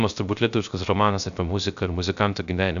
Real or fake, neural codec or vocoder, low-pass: fake; codec, 16 kHz, 0.3 kbps, FocalCodec; 7.2 kHz